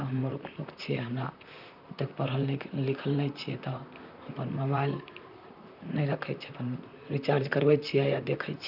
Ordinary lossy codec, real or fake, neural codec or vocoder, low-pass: none; fake; vocoder, 44.1 kHz, 128 mel bands, Pupu-Vocoder; 5.4 kHz